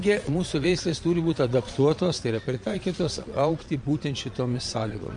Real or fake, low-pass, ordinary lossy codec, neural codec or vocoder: fake; 9.9 kHz; MP3, 48 kbps; vocoder, 22.05 kHz, 80 mel bands, Vocos